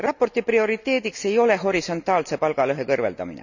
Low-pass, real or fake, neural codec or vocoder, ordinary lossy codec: 7.2 kHz; real; none; none